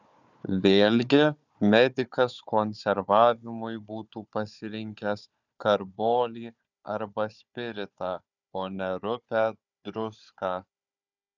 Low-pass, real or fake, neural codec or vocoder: 7.2 kHz; fake; codec, 16 kHz, 4 kbps, FunCodec, trained on Chinese and English, 50 frames a second